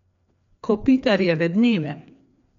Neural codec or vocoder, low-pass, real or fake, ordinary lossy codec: codec, 16 kHz, 2 kbps, FreqCodec, larger model; 7.2 kHz; fake; MP3, 64 kbps